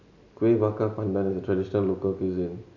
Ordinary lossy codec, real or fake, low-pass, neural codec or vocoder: none; real; 7.2 kHz; none